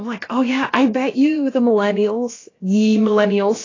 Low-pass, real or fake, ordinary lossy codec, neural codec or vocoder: 7.2 kHz; fake; AAC, 32 kbps; codec, 16 kHz, about 1 kbps, DyCAST, with the encoder's durations